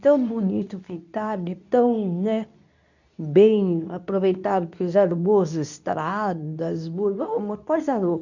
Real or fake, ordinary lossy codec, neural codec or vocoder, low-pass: fake; none; codec, 24 kHz, 0.9 kbps, WavTokenizer, medium speech release version 2; 7.2 kHz